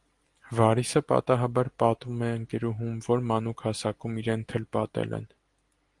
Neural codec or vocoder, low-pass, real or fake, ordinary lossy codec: none; 10.8 kHz; real; Opus, 24 kbps